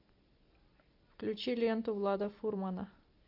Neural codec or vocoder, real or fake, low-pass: none; real; 5.4 kHz